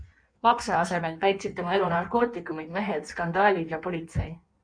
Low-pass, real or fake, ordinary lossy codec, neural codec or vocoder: 9.9 kHz; fake; MP3, 96 kbps; codec, 16 kHz in and 24 kHz out, 1.1 kbps, FireRedTTS-2 codec